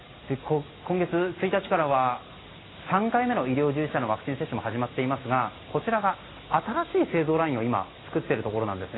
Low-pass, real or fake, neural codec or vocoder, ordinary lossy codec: 7.2 kHz; real; none; AAC, 16 kbps